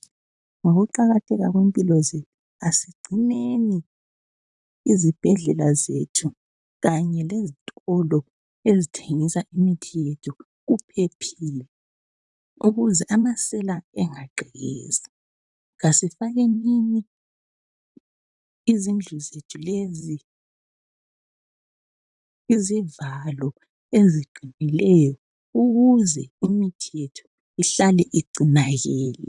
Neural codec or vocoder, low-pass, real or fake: vocoder, 44.1 kHz, 128 mel bands, Pupu-Vocoder; 10.8 kHz; fake